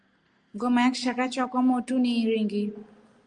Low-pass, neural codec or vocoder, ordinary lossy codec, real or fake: 10.8 kHz; none; Opus, 32 kbps; real